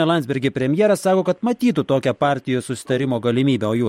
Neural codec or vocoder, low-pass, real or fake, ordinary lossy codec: none; 19.8 kHz; real; MP3, 64 kbps